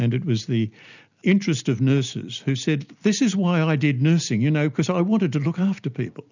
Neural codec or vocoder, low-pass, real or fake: none; 7.2 kHz; real